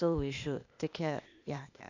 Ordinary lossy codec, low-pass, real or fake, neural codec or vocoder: none; 7.2 kHz; fake; codec, 16 kHz, 0.8 kbps, ZipCodec